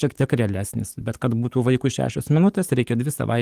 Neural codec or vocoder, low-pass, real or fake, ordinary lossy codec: codec, 44.1 kHz, 7.8 kbps, DAC; 14.4 kHz; fake; Opus, 24 kbps